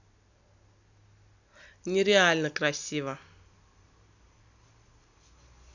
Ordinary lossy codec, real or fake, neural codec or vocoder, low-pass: none; real; none; 7.2 kHz